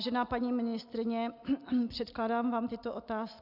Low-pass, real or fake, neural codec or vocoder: 5.4 kHz; real; none